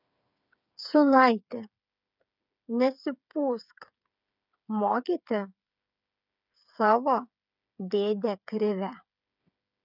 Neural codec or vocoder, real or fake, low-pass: codec, 16 kHz, 8 kbps, FreqCodec, smaller model; fake; 5.4 kHz